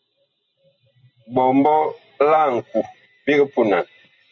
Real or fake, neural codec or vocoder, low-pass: real; none; 7.2 kHz